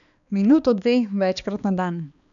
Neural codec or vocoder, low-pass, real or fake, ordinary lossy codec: codec, 16 kHz, 2 kbps, X-Codec, HuBERT features, trained on balanced general audio; 7.2 kHz; fake; none